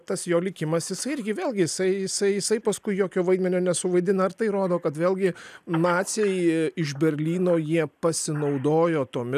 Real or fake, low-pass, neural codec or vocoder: real; 14.4 kHz; none